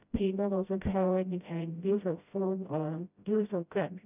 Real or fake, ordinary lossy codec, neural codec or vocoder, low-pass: fake; none; codec, 16 kHz, 0.5 kbps, FreqCodec, smaller model; 3.6 kHz